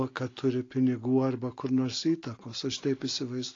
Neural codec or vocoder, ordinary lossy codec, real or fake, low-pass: none; AAC, 32 kbps; real; 7.2 kHz